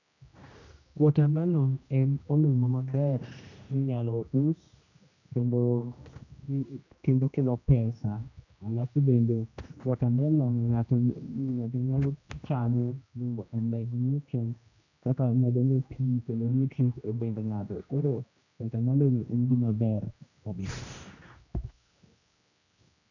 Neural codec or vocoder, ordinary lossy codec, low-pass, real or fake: codec, 16 kHz, 1 kbps, X-Codec, HuBERT features, trained on general audio; none; 7.2 kHz; fake